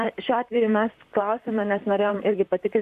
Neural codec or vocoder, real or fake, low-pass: vocoder, 44.1 kHz, 128 mel bands, Pupu-Vocoder; fake; 14.4 kHz